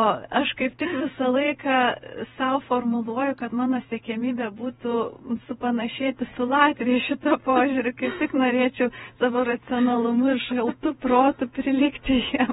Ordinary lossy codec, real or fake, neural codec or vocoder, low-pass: AAC, 16 kbps; fake; vocoder, 48 kHz, 128 mel bands, Vocos; 19.8 kHz